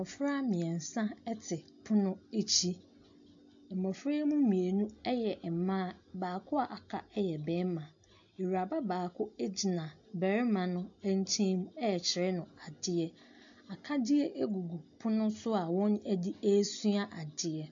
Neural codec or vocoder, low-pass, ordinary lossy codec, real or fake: none; 7.2 kHz; AAC, 64 kbps; real